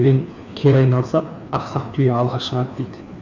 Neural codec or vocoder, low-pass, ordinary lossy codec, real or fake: codec, 16 kHz, 2 kbps, FreqCodec, larger model; 7.2 kHz; none; fake